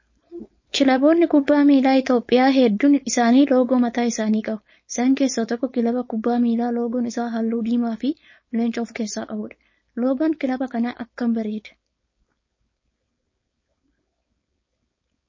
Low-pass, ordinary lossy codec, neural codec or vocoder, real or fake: 7.2 kHz; MP3, 32 kbps; codec, 16 kHz, 4.8 kbps, FACodec; fake